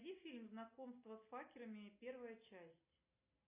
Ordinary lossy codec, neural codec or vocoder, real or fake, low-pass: AAC, 24 kbps; none; real; 3.6 kHz